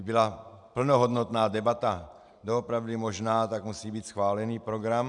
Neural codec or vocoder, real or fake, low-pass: none; real; 10.8 kHz